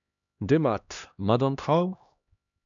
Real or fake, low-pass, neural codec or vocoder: fake; 7.2 kHz; codec, 16 kHz, 1 kbps, X-Codec, HuBERT features, trained on LibriSpeech